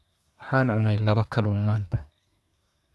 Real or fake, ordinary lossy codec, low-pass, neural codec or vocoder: fake; none; none; codec, 24 kHz, 1 kbps, SNAC